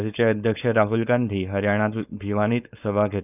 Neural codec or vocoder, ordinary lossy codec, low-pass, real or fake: codec, 16 kHz, 4.8 kbps, FACodec; none; 3.6 kHz; fake